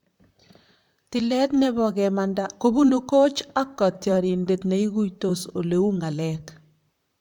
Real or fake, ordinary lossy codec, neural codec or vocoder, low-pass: fake; none; vocoder, 44.1 kHz, 128 mel bands, Pupu-Vocoder; 19.8 kHz